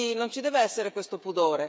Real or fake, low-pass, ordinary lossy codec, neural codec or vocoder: fake; none; none; codec, 16 kHz, 8 kbps, FreqCodec, smaller model